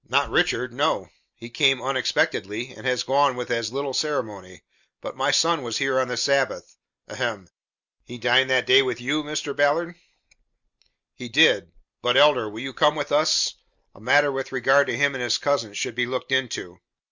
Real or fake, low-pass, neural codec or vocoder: real; 7.2 kHz; none